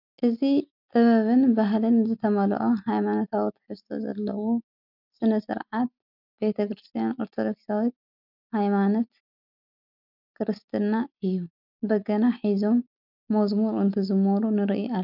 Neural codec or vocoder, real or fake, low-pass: none; real; 5.4 kHz